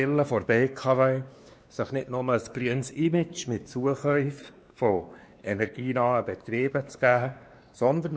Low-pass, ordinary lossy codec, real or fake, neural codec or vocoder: none; none; fake; codec, 16 kHz, 2 kbps, X-Codec, WavLM features, trained on Multilingual LibriSpeech